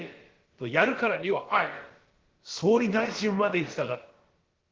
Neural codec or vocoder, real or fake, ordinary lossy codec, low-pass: codec, 16 kHz, about 1 kbps, DyCAST, with the encoder's durations; fake; Opus, 16 kbps; 7.2 kHz